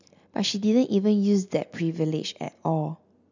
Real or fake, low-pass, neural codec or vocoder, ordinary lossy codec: real; 7.2 kHz; none; none